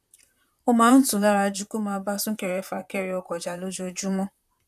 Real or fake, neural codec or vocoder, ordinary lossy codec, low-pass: fake; vocoder, 44.1 kHz, 128 mel bands, Pupu-Vocoder; none; 14.4 kHz